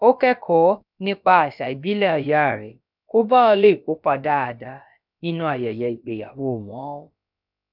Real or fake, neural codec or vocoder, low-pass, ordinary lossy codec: fake; codec, 16 kHz, about 1 kbps, DyCAST, with the encoder's durations; 5.4 kHz; none